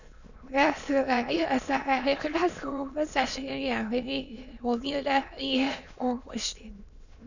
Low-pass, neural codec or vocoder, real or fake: 7.2 kHz; autoencoder, 22.05 kHz, a latent of 192 numbers a frame, VITS, trained on many speakers; fake